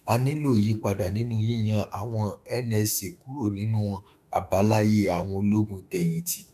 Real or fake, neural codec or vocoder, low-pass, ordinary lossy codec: fake; autoencoder, 48 kHz, 32 numbers a frame, DAC-VAE, trained on Japanese speech; 14.4 kHz; none